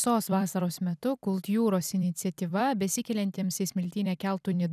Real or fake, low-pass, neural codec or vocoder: fake; 14.4 kHz; vocoder, 44.1 kHz, 128 mel bands every 256 samples, BigVGAN v2